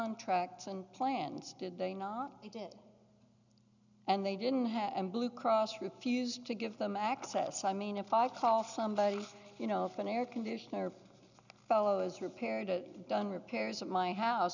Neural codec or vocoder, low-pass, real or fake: none; 7.2 kHz; real